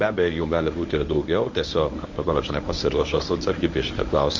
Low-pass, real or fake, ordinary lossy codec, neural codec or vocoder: 7.2 kHz; fake; MP3, 48 kbps; codec, 24 kHz, 0.9 kbps, WavTokenizer, medium speech release version 1